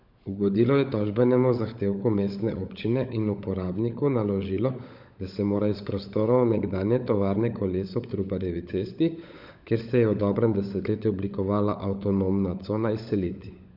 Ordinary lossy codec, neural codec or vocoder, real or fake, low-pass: Opus, 64 kbps; codec, 16 kHz, 16 kbps, FunCodec, trained on LibriTTS, 50 frames a second; fake; 5.4 kHz